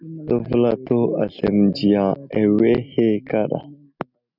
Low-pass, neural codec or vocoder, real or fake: 5.4 kHz; none; real